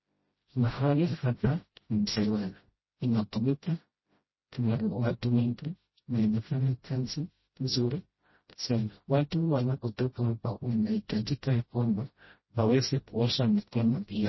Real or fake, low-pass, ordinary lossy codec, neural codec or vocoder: fake; 7.2 kHz; MP3, 24 kbps; codec, 16 kHz, 0.5 kbps, FreqCodec, smaller model